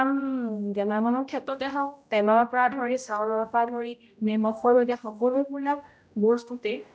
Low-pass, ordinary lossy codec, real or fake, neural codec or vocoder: none; none; fake; codec, 16 kHz, 0.5 kbps, X-Codec, HuBERT features, trained on general audio